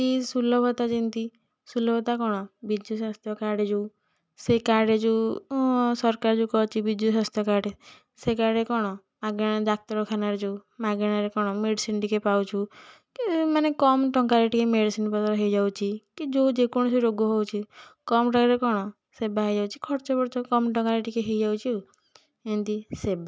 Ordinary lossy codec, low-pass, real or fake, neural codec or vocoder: none; none; real; none